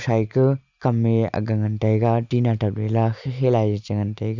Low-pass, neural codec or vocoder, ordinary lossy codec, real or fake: 7.2 kHz; none; none; real